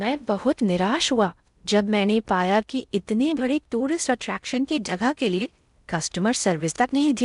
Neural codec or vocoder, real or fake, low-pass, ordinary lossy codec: codec, 16 kHz in and 24 kHz out, 0.6 kbps, FocalCodec, streaming, 4096 codes; fake; 10.8 kHz; none